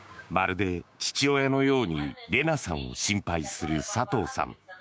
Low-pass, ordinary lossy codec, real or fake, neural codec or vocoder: none; none; fake; codec, 16 kHz, 6 kbps, DAC